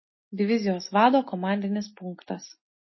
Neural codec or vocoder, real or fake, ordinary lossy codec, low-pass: none; real; MP3, 24 kbps; 7.2 kHz